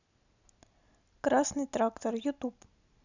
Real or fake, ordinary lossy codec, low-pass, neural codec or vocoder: real; none; 7.2 kHz; none